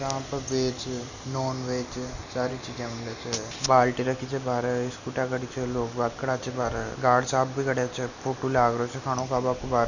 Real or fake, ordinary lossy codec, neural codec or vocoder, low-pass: real; none; none; 7.2 kHz